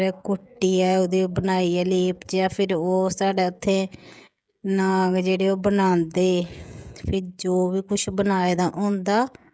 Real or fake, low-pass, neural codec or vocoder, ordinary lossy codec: fake; none; codec, 16 kHz, 16 kbps, FreqCodec, smaller model; none